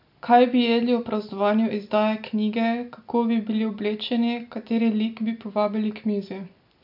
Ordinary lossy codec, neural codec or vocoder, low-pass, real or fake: AAC, 48 kbps; none; 5.4 kHz; real